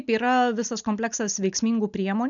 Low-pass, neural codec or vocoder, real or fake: 7.2 kHz; none; real